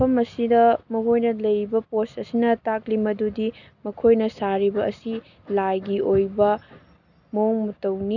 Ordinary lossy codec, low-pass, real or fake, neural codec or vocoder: none; 7.2 kHz; real; none